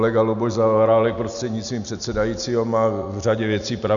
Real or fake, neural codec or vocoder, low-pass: real; none; 7.2 kHz